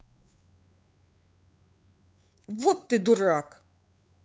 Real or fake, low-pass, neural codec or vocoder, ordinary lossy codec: fake; none; codec, 16 kHz, 4 kbps, X-Codec, WavLM features, trained on Multilingual LibriSpeech; none